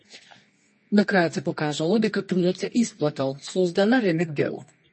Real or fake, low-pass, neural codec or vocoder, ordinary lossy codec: fake; 10.8 kHz; codec, 24 kHz, 0.9 kbps, WavTokenizer, medium music audio release; MP3, 32 kbps